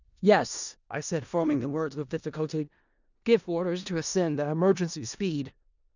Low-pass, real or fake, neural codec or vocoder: 7.2 kHz; fake; codec, 16 kHz in and 24 kHz out, 0.4 kbps, LongCat-Audio-Codec, four codebook decoder